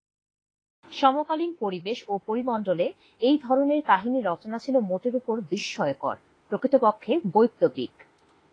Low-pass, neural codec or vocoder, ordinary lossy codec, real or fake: 9.9 kHz; autoencoder, 48 kHz, 32 numbers a frame, DAC-VAE, trained on Japanese speech; AAC, 32 kbps; fake